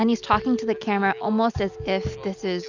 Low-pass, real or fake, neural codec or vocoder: 7.2 kHz; real; none